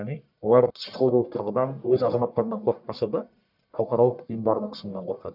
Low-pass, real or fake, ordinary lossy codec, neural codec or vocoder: 5.4 kHz; fake; none; codec, 44.1 kHz, 1.7 kbps, Pupu-Codec